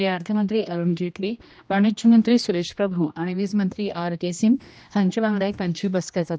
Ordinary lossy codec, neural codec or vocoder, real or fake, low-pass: none; codec, 16 kHz, 1 kbps, X-Codec, HuBERT features, trained on general audio; fake; none